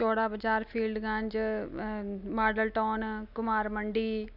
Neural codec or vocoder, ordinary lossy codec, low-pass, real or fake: none; none; 5.4 kHz; real